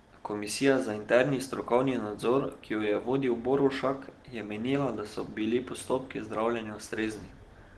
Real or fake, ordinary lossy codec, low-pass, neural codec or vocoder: real; Opus, 16 kbps; 10.8 kHz; none